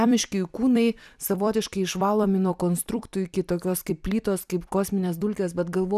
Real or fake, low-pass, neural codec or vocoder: fake; 14.4 kHz; vocoder, 44.1 kHz, 128 mel bands every 256 samples, BigVGAN v2